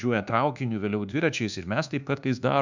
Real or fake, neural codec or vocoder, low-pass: fake; codec, 24 kHz, 1.2 kbps, DualCodec; 7.2 kHz